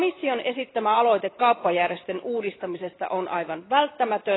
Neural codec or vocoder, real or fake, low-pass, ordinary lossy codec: none; real; 7.2 kHz; AAC, 16 kbps